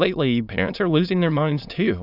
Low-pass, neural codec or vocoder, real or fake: 5.4 kHz; autoencoder, 22.05 kHz, a latent of 192 numbers a frame, VITS, trained on many speakers; fake